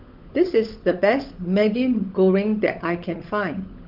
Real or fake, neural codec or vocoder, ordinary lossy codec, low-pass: fake; codec, 16 kHz, 16 kbps, FunCodec, trained on LibriTTS, 50 frames a second; Opus, 24 kbps; 5.4 kHz